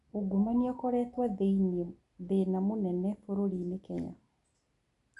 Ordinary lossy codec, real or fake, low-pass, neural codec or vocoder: none; real; 10.8 kHz; none